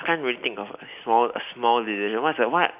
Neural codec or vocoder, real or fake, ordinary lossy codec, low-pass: none; real; none; 3.6 kHz